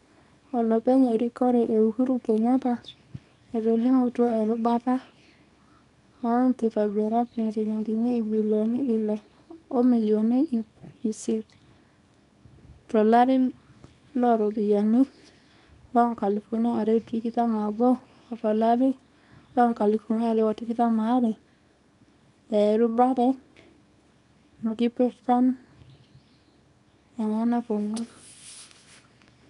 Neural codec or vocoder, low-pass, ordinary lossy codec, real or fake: codec, 24 kHz, 0.9 kbps, WavTokenizer, small release; 10.8 kHz; none; fake